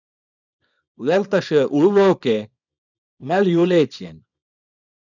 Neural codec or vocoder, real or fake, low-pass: codec, 24 kHz, 0.9 kbps, WavTokenizer, small release; fake; 7.2 kHz